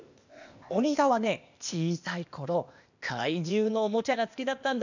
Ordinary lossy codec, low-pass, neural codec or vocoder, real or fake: none; 7.2 kHz; codec, 16 kHz, 0.8 kbps, ZipCodec; fake